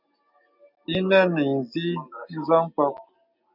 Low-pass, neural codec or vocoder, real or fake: 5.4 kHz; none; real